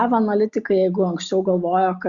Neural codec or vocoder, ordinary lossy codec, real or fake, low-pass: none; Opus, 64 kbps; real; 7.2 kHz